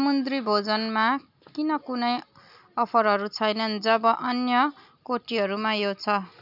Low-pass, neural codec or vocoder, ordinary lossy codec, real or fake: 5.4 kHz; none; none; real